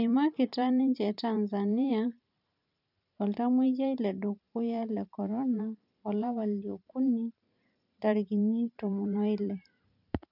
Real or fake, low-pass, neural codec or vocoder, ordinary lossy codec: fake; 5.4 kHz; vocoder, 44.1 kHz, 128 mel bands every 512 samples, BigVGAN v2; none